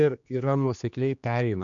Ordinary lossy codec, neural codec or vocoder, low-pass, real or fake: MP3, 64 kbps; codec, 16 kHz, 2 kbps, X-Codec, HuBERT features, trained on general audio; 7.2 kHz; fake